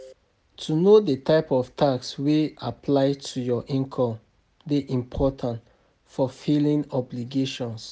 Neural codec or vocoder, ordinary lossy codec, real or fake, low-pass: none; none; real; none